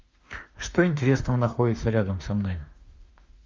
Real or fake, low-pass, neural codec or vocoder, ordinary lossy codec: fake; 7.2 kHz; autoencoder, 48 kHz, 32 numbers a frame, DAC-VAE, trained on Japanese speech; Opus, 32 kbps